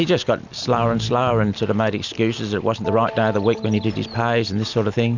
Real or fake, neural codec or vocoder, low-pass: real; none; 7.2 kHz